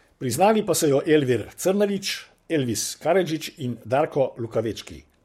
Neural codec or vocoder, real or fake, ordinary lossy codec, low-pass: codec, 44.1 kHz, 7.8 kbps, Pupu-Codec; fake; MP3, 64 kbps; 19.8 kHz